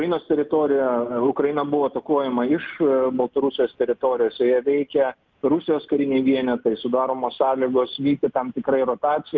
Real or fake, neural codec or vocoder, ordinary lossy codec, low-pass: real; none; Opus, 24 kbps; 7.2 kHz